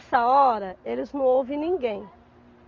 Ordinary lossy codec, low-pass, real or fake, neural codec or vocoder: Opus, 32 kbps; 7.2 kHz; real; none